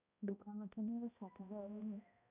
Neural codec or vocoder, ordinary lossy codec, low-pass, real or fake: codec, 16 kHz, 0.5 kbps, X-Codec, HuBERT features, trained on balanced general audio; none; 3.6 kHz; fake